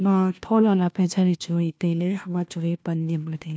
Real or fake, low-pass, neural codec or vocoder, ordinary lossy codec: fake; none; codec, 16 kHz, 1 kbps, FunCodec, trained on LibriTTS, 50 frames a second; none